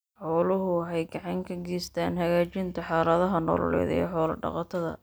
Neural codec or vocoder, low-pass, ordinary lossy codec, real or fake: none; none; none; real